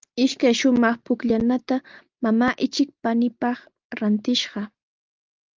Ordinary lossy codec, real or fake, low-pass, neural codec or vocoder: Opus, 32 kbps; real; 7.2 kHz; none